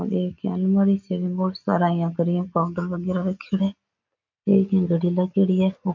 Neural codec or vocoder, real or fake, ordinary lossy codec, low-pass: none; real; MP3, 64 kbps; 7.2 kHz